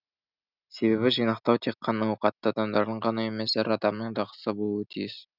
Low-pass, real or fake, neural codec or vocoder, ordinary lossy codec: 5.4 kHz; real; none; none